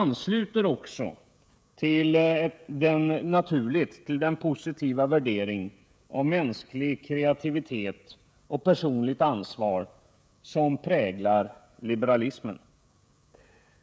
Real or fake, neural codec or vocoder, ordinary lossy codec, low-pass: fake; codec, 16 kHz, 16 kbps, FreqCodec, smaller model; none; none